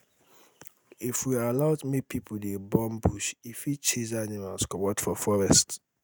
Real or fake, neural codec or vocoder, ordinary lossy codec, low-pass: real; none; none; none